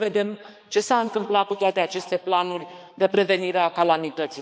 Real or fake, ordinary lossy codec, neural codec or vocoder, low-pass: fake; none; codec, 16 kHz, 2 kbps, X-Codec, HuBERT features, trained on balanced general audio; none